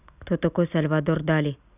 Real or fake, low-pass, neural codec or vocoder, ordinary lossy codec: real; 3.6 kHz; none; none